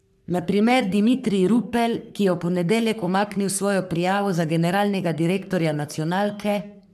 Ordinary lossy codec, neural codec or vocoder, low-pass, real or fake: none; codec, 44.1 kHz, 3.4 kbps, Pupu-Codec; 14.4 kHz; fake